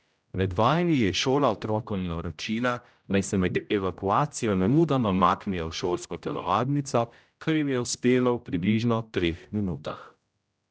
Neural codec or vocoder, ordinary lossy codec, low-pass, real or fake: codec, 16 kHz, 0.5 kbps, X-Codec, HuBERT features, trained on general audio; none; none; fake